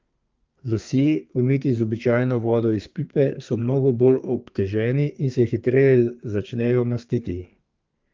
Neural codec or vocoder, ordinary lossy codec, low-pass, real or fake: codec, 32 kHz, 1.9 kbps, SNAC; Opus, 24 kbps; 7.2 kHz; fake